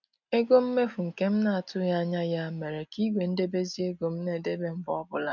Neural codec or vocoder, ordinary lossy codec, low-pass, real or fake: none; none; 7.2 kHz; real